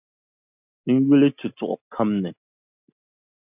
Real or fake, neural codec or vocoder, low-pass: real; none; 3.6 kHz